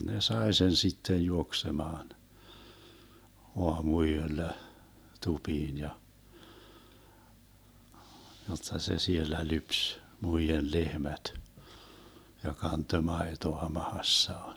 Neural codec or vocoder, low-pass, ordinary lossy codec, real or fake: vocoder, 44.1 kHz, 128 mel bands every 512 samples, BigVGAN v2; none; none; fake